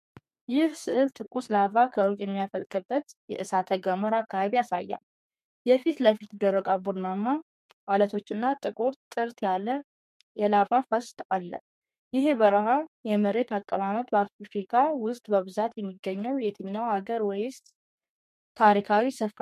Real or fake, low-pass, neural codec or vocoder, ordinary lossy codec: fake; 14.4 kHz; codec, 32 kHz, 1.9 kbps, SNAC; MP3, 64 kbps